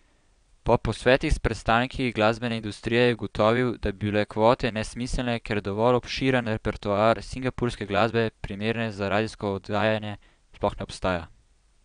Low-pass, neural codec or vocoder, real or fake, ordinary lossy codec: 9.9 kHz; vocoder, 22.05 kHz, 80 mel bands, Vocos; fake; none